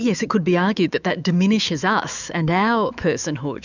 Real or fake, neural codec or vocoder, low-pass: fake; autoencoder, 48 kHz, 128 numbers a frame, DAC-VAE, trained on Japanese speech; 7.2 kHz